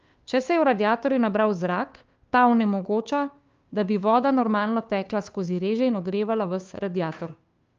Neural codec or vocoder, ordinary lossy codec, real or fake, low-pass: codec, 16 kHz, 2 kbps, FunCodec, trained on Chinese and English, 25 frames a second; Opus, 24 kbps; fake; 7.2 kHz